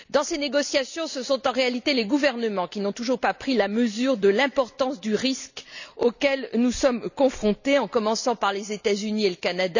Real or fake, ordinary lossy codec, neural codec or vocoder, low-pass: real; none; none; 7.2 kHz